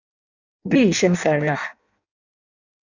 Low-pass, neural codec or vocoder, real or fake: 7.2 kHz; codec, 16 kHz in and 24 kHz out, 0.6 kbps, FireRedTTS-2 codec; fake